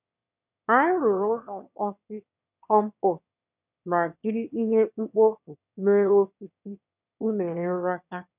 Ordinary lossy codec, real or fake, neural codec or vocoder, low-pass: none; fake; autoencoder, 22.05 kHz, a latent of 192 numbers a frame, VITS, trained on one speaker; 3.6 kHz